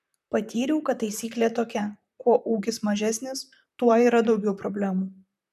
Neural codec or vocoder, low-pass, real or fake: vocoder, 44.1 kHz, 128 mel bands, Pupu-Vocoder; 14.4 kHz; fake